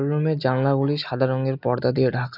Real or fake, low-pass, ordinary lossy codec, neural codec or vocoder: real; 5.4 kHz; none; none